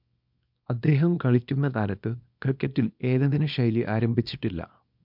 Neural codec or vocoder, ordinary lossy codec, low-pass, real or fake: codec, 24 kHz, 0.9 kbps, WavTokenizer, small release; MP3, 48 kbps; 5.4 kHz; fake